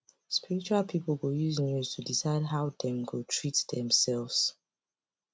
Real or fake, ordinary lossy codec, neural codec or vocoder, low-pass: real; none; none; none